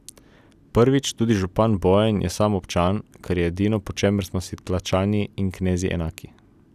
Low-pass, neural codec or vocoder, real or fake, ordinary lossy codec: 14.4 kHz; none; real; none